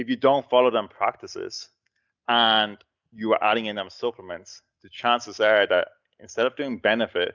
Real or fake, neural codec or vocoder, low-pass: real; none; 7.2 kHz